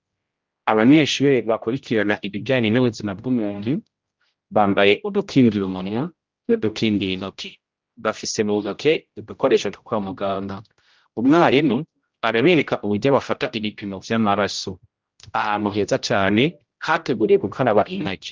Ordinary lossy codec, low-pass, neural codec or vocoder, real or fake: Opus, 24 kbps; 7.2 kHz; codec, 16 kHz, 0.5 kbps, X-Codec, HuBERT features, trained on general audio; fake